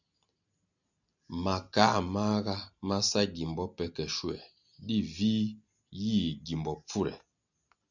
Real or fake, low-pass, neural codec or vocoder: real; 7.2 kHz; none